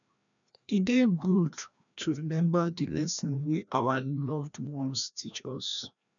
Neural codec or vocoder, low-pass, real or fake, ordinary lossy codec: codec, 16 kHz, 1 kbps, FreqCodec, larger model; 7.2 kHz; fake; none